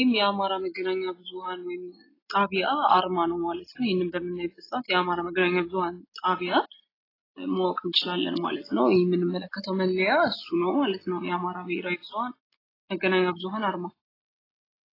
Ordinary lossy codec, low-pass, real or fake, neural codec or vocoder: AAC, 24 kbps; 5.4 kHz; real; none